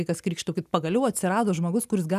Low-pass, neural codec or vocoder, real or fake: 14.4 kHz; none; real